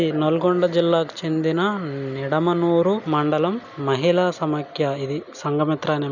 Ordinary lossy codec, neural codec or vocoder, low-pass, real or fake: none; none; 7.2 kHz; real